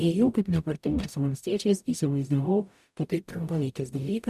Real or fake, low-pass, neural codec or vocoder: fake; 14.4 kHz; codec, 44.1 kHz, 0.9 kbps, DAC